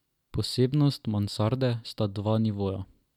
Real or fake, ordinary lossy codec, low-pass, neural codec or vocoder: real; none; 19.8 kHz; none